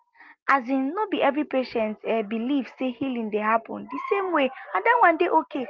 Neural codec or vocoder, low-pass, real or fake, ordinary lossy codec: none; 7.2 kHz; real; Opus, 32 kbps